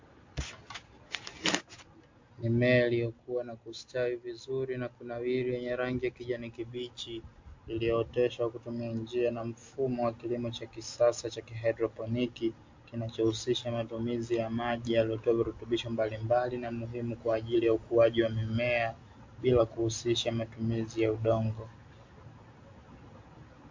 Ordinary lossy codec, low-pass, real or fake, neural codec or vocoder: MP3, 48 kbps; 7.2 kHz; real; none